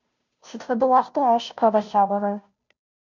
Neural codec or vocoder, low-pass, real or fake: codec, 16 kHz, 0.5 kbps, FunCodec, trained on Chinese and English, 25 frames a second; 7.2 kHz; fake